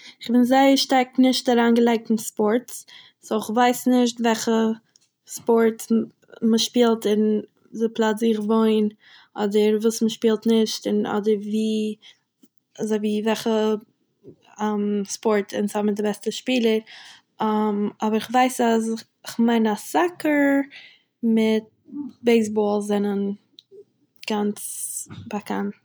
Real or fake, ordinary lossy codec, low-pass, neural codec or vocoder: real; none; none; none